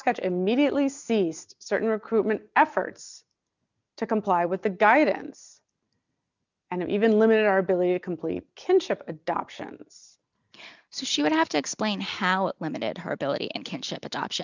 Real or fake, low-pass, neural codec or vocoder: real; 7.2 kHz; none